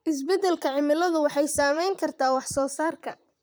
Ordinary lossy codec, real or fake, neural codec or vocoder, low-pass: none; fake; vocoder, 44.1 kHz, 128 mel bands, Pupu-Vocoder; none